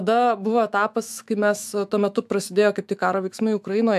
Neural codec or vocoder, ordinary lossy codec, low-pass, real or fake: autoencoder, 48 kHz, 128 numbers a frame, DAC-VAE, trained on Japanese speech; MP3, 96 kbps; 14.4 kHz; fake